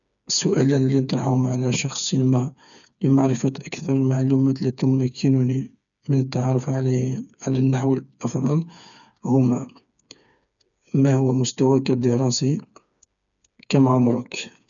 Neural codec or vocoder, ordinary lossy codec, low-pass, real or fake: codec, 16 kHz, 4 kbps, FreqCodec, smaller model; none; 7.2 kHz; fake